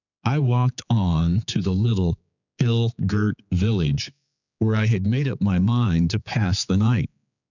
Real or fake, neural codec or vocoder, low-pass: fake; codec, 16 kHz, 4 kbps, X-Codec, HuBERT features, trained on general audio; 7.2 kHz